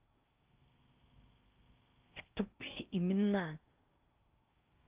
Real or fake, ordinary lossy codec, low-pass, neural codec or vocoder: fake; Opus, 24 kbps; 3.6 kHz; codec, 16 kHz in and 24 kHz out, 0.6 kbps, FocalCodec, streaming, 4096 codes